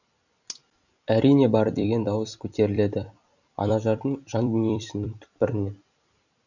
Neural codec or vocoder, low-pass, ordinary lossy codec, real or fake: vocoder, 44.1 kHz, 128 mel bands every 256 samples, BigVGAN v2; 7.2 kHz; none; fake